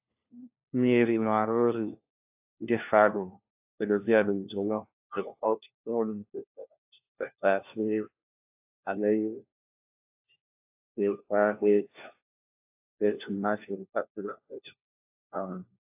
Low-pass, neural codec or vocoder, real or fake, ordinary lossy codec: 3.6 kHz; codec, 16 kHz, 1 kbps, FunCodec, trained on LibriTTS, 50 frames a second; fake; AAC, 32 kbps